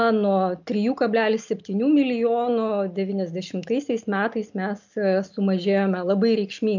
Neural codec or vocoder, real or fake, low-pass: none; real; 7.2 kHz